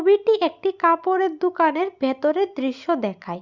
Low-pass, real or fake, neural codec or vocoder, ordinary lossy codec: 7.2 kHz; real; none; Opus, 64 kbps